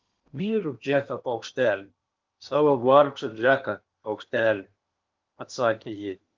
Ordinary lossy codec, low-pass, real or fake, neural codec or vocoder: Opus, 32 kbps; 7.2 kHz; fake; codec, 16 kHz in and 24 kHz out, 0.8 kbps, FocalCodec, streaming, 65536 codes